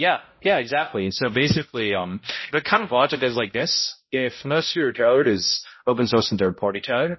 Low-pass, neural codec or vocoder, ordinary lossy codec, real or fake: 7.2 kHz; codec, 16 kHz, 0.5 kbps, X-Codec, HuBERT features, trained on balanced general audio; MP3, 24 kbps; fake